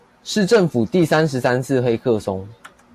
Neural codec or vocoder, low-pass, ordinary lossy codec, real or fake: none; 14.4 kHz; AAC, 64 kbps; real